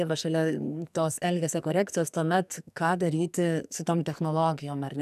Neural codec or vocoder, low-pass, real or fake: codec, 44.1 kHz, 2.6 kbps, SNAC; 14.4 kHz; fake